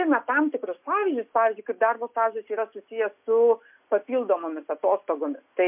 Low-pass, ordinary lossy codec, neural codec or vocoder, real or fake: 3.6 kHz; MP3, 32 kbps; none; real